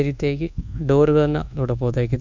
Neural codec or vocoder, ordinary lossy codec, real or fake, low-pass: codec, 24 kHz, 1.2 kbps, DualCodec; none; fake; 7.2 kHz